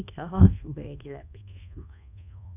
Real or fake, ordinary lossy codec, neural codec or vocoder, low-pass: fake; none; codec, 24 kHz, 1.2 kbps, DualCodec; 3.6 kHz